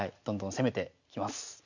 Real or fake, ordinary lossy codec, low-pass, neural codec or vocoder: real; none; 7.2 kHz; none